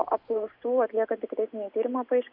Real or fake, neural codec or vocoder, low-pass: real; none; 5.4 kHz